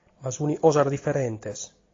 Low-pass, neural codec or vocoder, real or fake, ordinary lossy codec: 7.2 kHz; none; real; AAC, 32 kbps